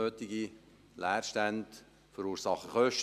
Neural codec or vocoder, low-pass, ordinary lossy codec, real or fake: none; 14.4 kHz; MP3, 96 kbps; real